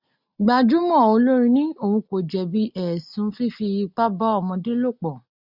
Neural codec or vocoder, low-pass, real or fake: none; 5.4 kHz; real